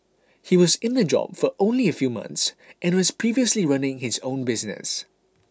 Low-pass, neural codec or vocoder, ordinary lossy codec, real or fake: none; none; none; real